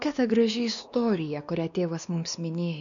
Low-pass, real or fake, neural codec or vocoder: 7.2 kHz; fake; codec, 16 kHz, 2 kbps, X-Codec, WavLM features, trained on Multilingual LibriSpeech